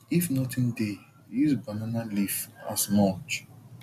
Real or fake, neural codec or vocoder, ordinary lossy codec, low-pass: real; none; none; 14.4 kHz